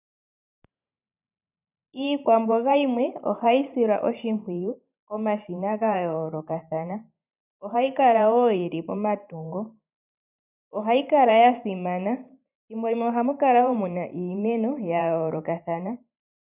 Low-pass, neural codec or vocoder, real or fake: 3.6 kHz; vocoder, 44.1 kHz, 128 mel bands every 512 samples, BigVGAN v2; fake